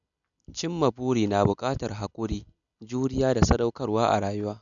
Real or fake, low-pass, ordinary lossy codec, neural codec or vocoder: real; 7.2 kHz; none; none